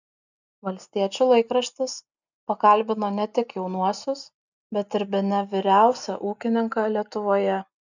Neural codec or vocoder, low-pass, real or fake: none; 7.2 kHz; real